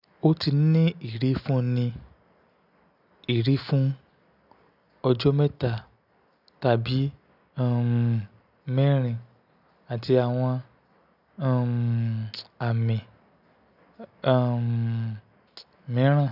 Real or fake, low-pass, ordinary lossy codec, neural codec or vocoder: real; 5.4 kHz; none; none